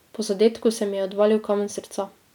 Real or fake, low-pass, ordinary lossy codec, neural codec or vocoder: real; 19.8 kHz; none; none